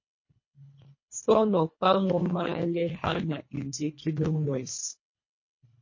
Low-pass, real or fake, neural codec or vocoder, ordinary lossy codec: 7.2 kHz; fake; codec, 24 kHz, 1.5 kbps, HILCodec; MP3, 32 kbps